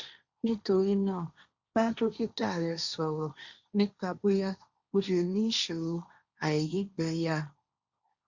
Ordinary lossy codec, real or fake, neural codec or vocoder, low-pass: Opus, 64 kbps; fake; codec, 16 kHz, 1.1 kbps, Voila-Tokenizer; 7.2 kHz